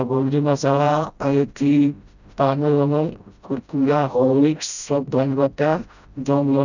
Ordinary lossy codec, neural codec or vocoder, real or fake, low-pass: none; codec, 16 kHz, 0.5 kbps, FreqCodec, smaller model; fake; 7.2 kHz